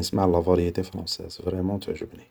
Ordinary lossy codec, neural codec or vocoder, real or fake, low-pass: none; none; real; none